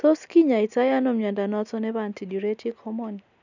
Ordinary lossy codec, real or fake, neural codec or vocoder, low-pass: none; real; none; 7.2 kHz